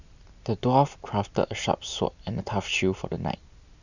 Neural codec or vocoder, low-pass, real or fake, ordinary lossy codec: none; 7.2 kHz; real; none